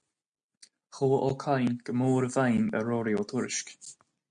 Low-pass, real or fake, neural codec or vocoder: 9.9 kHz; real; none